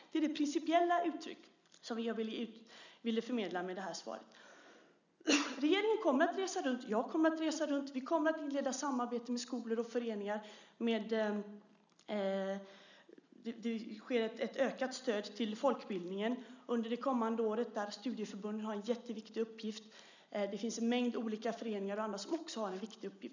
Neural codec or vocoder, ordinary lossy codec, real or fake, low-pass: none; none; real; 7.2 kHz